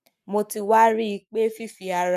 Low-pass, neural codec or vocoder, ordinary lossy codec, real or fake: 14.4 kHz; codec, 44.1 kHz, 7.8 kbps, Pupu-Codec; none; fake